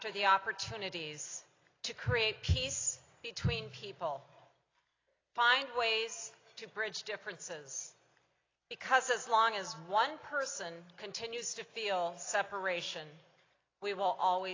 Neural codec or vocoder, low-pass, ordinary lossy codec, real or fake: none; 7.2 kHz; AAC, 32 kbps; real